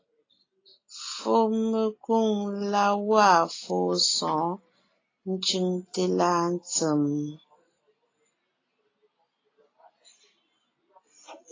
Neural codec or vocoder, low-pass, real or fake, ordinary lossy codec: none; 7.2 kHz; real; AAC, 32 kbps